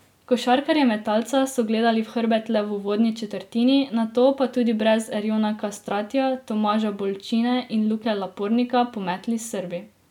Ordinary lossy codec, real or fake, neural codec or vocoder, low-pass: none; real; none; 19.8 kHz